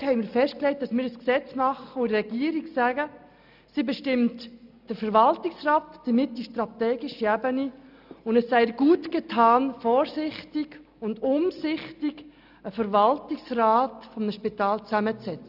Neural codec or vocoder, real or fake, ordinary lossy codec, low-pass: none; real; none; 5.4 kHz